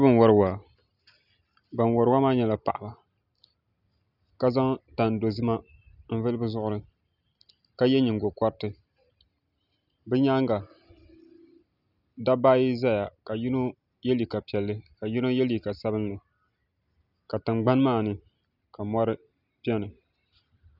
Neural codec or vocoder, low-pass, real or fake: none; 5.4 kHz; real